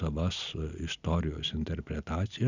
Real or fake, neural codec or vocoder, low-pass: real; none; 7.2 kHz